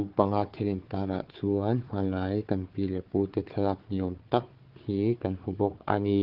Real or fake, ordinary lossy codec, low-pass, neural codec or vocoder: fake; Opus, 16 kbps; 5.4 kHz; codec, 16 kHz, 4 kbps, FunCodec, trained on Chinese and English, 50 frames a second